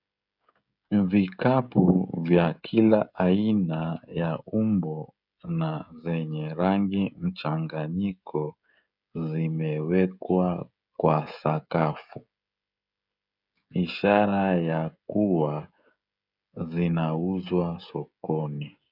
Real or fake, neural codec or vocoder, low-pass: fake; codec, 16 kHz, 16 kbps, FreqCodec, smaller model; 5.4 kHz